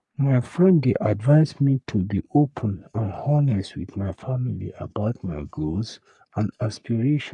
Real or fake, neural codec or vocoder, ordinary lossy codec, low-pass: fake; codec, 44.1 kHz, 3.4 kbps, Pupu-Codec; none; 10.8 kHz